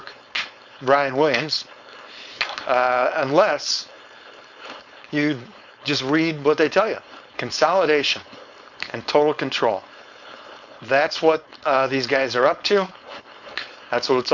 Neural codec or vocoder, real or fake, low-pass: codec, 16 kHz, 4.8 kbps, FACodec; fake; 7.2 kHz